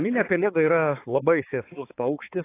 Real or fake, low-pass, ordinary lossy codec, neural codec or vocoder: fake; 3.6 kHz; AAC, 24 kbps; codec, 16 kHz, 4 kbps, X-Codec, HuBERT features, trained on balanced general audio